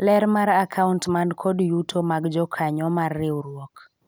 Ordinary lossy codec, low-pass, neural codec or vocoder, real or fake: none; none; none; real